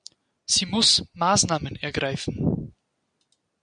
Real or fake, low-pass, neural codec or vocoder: real; 9.9 kHz; none